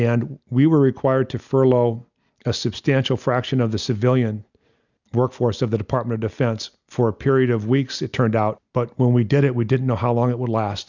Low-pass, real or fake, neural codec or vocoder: 7.2 kHz; real; none